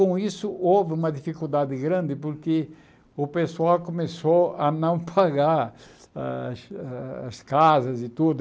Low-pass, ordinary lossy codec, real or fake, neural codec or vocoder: none; none; real; none